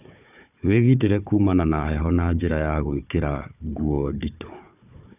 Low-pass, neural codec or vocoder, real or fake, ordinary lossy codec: 3.6 kHz; codec, 16 kHz, 4 kbps, FunCodec, trained on Chinese and English, 50 frames a second; fake; none